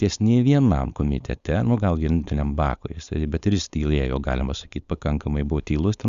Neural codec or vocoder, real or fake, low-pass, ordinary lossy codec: codec, 16 kHz, 4.8 kbps, FACodec; fake; 7.2 kHz; Opus, 64 kbps